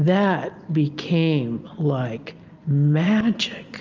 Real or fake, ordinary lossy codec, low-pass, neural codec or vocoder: real; Opus, 32 kbps; 7.2 kHz; none